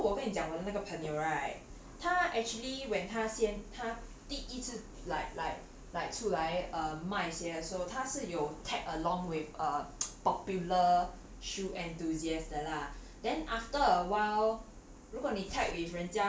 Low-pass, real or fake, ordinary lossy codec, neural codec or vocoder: none; real; none; none